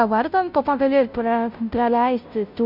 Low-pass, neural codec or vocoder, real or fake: 5.4 kHz; codec, 16 kHz, 0.5 kbps, FunCodec, trained on Chinese and English, 25 frames a second; fake